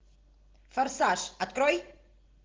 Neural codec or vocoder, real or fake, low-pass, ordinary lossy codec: none; real; 7.2 kHz; Opus, 16 kbps